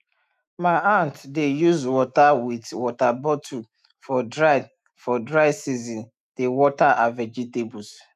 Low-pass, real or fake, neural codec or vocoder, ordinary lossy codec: 14.4 kHz; fake; autoencoder, 48 kHz, 128 numbers a frame, DAC-VAE, trained on Japanese speech; none